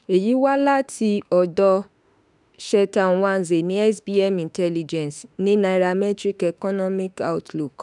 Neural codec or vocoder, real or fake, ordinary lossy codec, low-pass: autoencoder, 48 kHz, 32 numbers a frame, DAC-VAE, trained on Japanese speech; fake; none; 10.8 kHz